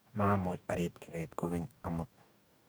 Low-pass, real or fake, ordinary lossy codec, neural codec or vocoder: none; fake; none; codec, 44.1 kHz, 2.6 kbps, DAC